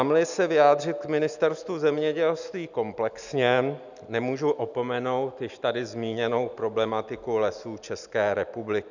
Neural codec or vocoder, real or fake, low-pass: none; real; 7.2 kHz